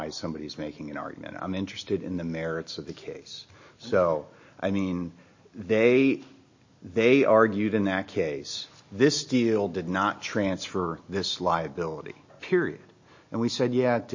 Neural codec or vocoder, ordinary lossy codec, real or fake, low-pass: none; MP3, 32 kbps; real; 7.2 kHz